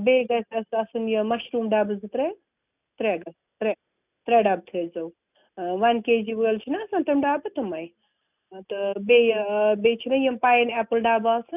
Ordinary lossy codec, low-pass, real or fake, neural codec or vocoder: none; 3.6 kHz; real; none